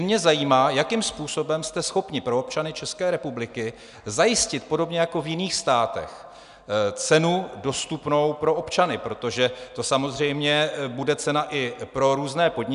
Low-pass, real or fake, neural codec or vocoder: 10.8 kHz; real; none